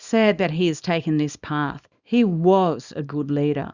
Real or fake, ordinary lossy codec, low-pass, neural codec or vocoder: fake; Opus, 64 kbps; 7.2 kHz; codec, 24 kHz, 0.9 kbps, WavTokenizer, small release